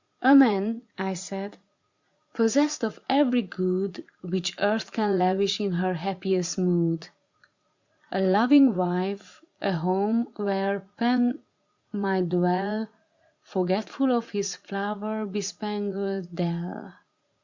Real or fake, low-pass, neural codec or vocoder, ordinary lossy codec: fake; 7.2 kHz; vocoder, 44.1 kHz, 80 mel bands, Vocos; Opus, 64 kbps